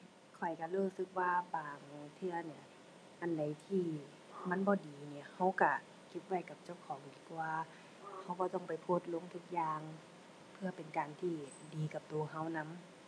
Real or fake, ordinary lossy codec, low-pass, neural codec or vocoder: real; none; none; none